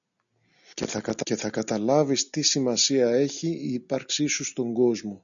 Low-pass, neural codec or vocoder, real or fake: 7.2 kHz; none; real